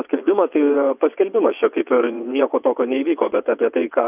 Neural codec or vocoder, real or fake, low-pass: vocoder, 22.05 kHz, 80 mel bands, WaveNeXt; fake; 3.6 kHz